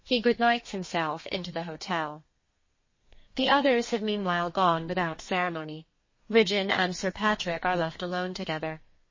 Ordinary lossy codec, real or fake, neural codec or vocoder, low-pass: MP3, 32 kbps; fake; codec, 32 kHz, 1.9 kbps, SNAC; 7.2 kHz